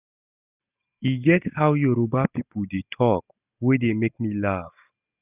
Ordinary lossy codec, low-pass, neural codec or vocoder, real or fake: none; 3.6 kHz; none; real